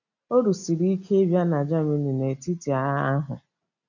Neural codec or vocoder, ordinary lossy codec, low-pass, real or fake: none; none; 7.2 kHz; real